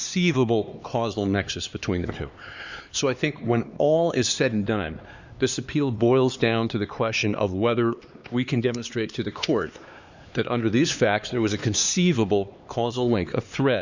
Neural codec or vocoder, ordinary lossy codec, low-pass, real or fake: codec, 16 kHz, 2 kbps, X-Codec, HuBERT features, trained on LibriSpeech; Opus, 64 kbps; 7.2 kHz; fake